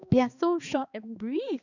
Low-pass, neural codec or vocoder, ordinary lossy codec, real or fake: 7.2 kHz; codec, 16 kHz, 2 kbps, X-Codec, HuBERT features, trained on balanced general audio; none; fake